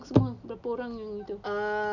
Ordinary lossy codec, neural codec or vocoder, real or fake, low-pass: none; none; real; 7.2 kHz